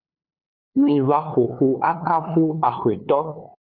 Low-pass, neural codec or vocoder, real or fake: 5.4 kHz; codec, 16 kHz, 2 kbps, FunCodec, trained on LibriTTS, 25 frames a second; fake